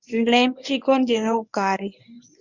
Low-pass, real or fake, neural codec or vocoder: 7.2 kHz; fake; codec, 24 kHz, 0.9 kbps, WavTokenizer, medium speech release version 1